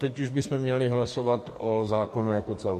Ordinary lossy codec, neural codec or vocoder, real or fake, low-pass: MP3, 64 kbps; codec, 44.1 kHz, 2.6 kbps, SNAC; fake; 14.4 kHz